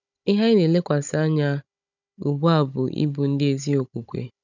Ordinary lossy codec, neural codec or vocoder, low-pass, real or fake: none; codec, 16 kHz, 16 kbps, FunCodec, trained on Chinese and English, 50 frames a second; 7.2 kHz; fake